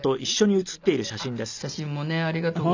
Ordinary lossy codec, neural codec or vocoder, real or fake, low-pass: none; none; real; 7.2 kHz